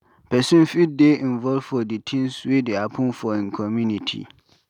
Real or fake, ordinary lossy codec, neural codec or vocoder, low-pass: real; none; none; 19.8 kHz